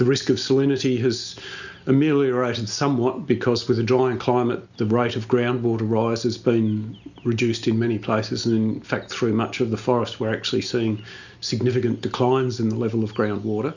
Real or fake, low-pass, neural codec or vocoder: real; 7.2 kHz; none